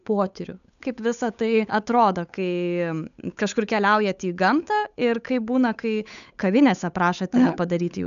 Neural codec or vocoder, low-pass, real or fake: codec, 16 kHz, 8 kbps, FunCodec, trained on Chinese and English, 25 frames a second; 7.2 kHz; fake